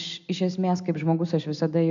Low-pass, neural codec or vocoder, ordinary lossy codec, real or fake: 7.2 kHz; none; AAC, 64 kbps; real